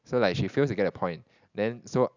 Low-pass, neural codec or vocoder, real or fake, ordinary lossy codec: 7.2 kHz; none; real; none